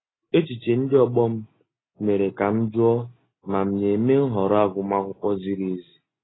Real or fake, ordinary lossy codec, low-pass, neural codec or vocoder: real; AAC, 16 kbps; 7.2 kHz; none